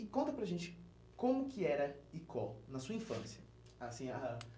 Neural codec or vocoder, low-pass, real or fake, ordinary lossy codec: none; none; real; none